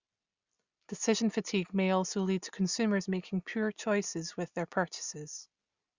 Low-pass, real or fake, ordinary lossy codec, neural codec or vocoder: 7.2 kHz; real; Opus, 64 kbps; none